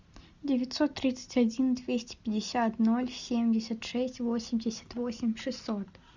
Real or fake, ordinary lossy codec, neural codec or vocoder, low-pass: real; Opus, 64 kbps; none; 7.2 kHz